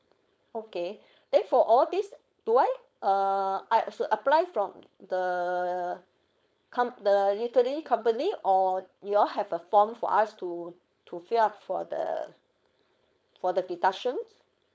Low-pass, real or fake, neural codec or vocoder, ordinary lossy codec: none; fake; codec, 16 kHz, 4.8 kbps, FACodec; none